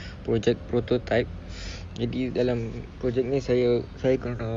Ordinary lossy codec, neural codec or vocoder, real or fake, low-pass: Opus, 64 kbps; none; real; 9.9 kHz